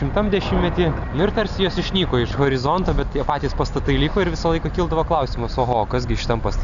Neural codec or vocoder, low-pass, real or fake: none; 7.2 kHz; real